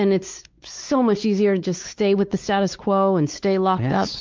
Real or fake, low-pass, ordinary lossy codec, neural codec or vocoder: fake; 7.2 kHz; Opus, 32 kbps; codec, 16 kHz, 4 kbps, X-Codec, WavLM features, trained on Multilingual LibriSpeech